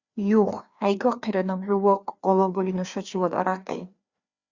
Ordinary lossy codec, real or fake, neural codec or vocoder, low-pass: Opus, 64 kbps; fake; codec, 16 kHz, 2 kbps, FreqCodec, larger model; 7.2 kHz